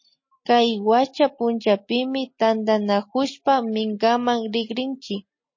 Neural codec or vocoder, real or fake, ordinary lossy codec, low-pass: none; real; MP3, 32 kbps; 7.2 kHz